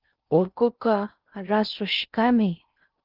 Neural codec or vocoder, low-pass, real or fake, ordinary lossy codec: codec, 16 kHz in and 24 kHz out, 0.6 kbps, FocalCodec, streaming, 4096 codes; 5.4 kHz; fake; Opus, 32 kbps